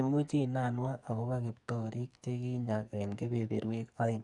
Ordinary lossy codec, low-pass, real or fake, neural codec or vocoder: none; 10.8 kHz; fake; codec, 44.1 kHz, 2.6 kbps, SNAC